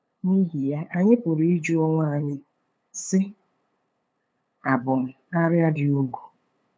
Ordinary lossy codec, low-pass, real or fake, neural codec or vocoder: none; none; fake; codec, 16 kHz, 8 kbps, FunCodec, trained on LibriTTS, 25 frames a second